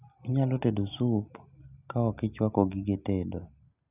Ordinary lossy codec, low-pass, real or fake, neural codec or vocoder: AAC, 32 kbps; 3.6 kHz; real; none